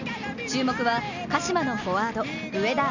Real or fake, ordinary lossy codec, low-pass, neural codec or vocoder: real; none; 7.2 kHz; none